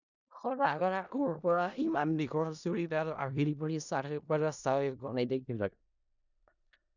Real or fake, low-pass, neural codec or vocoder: fake; 7.2 kHz; codec, 16 kHz in and 24 kHz out, 0.4 kbps, LongCat-Audio-Codec, four codebook decoder